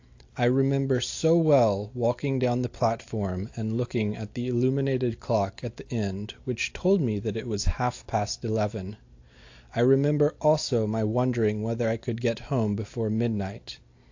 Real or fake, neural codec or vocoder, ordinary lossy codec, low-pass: real; none; AAC, 48 kbps; 7.2 kHz